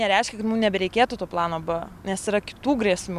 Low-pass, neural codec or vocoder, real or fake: 14.4 kHz; none; real